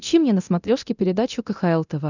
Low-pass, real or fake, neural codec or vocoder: 7.2 kHz; fake; codec, 24 kHz, 0.9 kbps, DualCodec